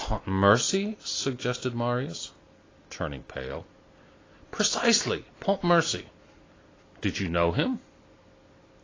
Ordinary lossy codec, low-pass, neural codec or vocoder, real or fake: AAC, 32 kbps; 7.2 kHz; none; real